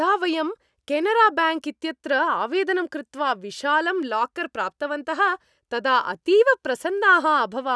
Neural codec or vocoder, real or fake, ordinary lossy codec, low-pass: none; real; none; none